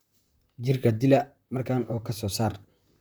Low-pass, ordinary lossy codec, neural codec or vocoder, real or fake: none; none; vocoder, 44.1 kHz, 128 mel bands, Pupu-Vocoder; fake